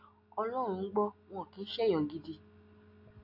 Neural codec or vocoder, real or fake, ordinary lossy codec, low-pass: none; real; AAC, 48 kbps; 5.4 kHz